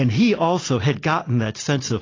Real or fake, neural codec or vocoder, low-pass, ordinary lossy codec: real; none; 7.2 kHz; AAC, 32 kbps